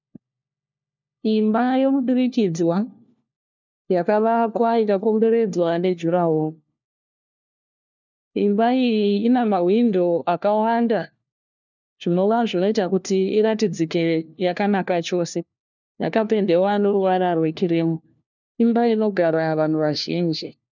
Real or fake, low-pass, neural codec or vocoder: fake; 7.2 kHz; codec, 16 kHz, 1 kbps, FunCodec, trained on LibriTTS, 50 frames a second